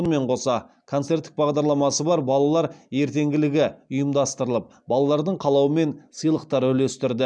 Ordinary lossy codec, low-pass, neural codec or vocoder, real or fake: none; 9.9 kHz; none; real